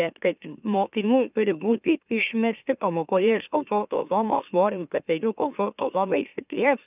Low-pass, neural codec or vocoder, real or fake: 3.6 kHz; autoencoder, 44.1 kHz, a latent of 192 numbers a frame, MeloTTS; fake